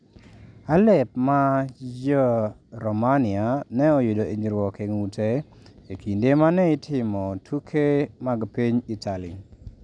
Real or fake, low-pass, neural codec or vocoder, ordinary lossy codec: real; 9.9 kHz; none; none